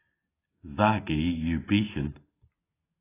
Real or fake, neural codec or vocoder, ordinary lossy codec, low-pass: real; none; AAC, 16 kbps; 3.6 kHz